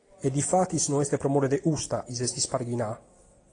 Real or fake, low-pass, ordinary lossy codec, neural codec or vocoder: real; 9.9 kHz; AAC, 32 kbps; none